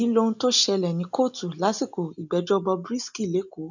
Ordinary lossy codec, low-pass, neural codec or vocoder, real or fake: none; 7.2 kHz; none; real